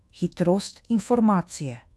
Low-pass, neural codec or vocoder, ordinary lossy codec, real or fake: none; codec, 24 kHz, 1.2 kbps, DualCodec; none; fake